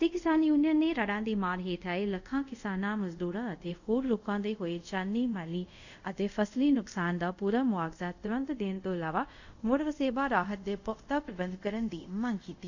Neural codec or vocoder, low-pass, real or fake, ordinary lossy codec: codec, 24 kHz, 0.5 kbps, DualCodec; 7.2 kHz; fake; none